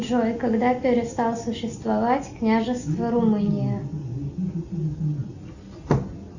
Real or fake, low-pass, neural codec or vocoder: real; 7.2 kHz; none